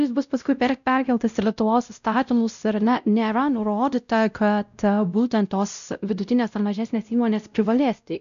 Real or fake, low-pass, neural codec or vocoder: fake; 7.2 kHz; codec, 16 kHz, 0.5 kbps, X-Codec, WavLM features, trained on Multilingual LibriSpeech